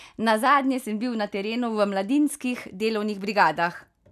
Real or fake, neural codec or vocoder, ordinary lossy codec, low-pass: real; none; none; 14.4 kHz